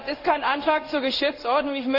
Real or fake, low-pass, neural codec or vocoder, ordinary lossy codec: fake; 5.4 kHz; codec, 16 kHz in and 24 kHz out, 1 kbps, XY-Tokenizer; none